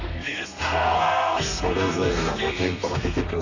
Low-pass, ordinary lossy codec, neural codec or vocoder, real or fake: 7.2 kHz; none; codec, 32 kHz, 1.9 kbps, SNAC; fake